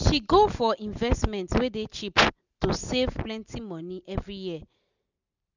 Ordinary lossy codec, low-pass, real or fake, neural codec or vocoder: none; 7.2 kHz; real; none